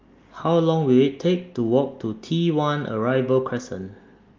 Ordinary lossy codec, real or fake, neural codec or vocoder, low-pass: Opus, 32 kbps; real; none; 7.2 kHz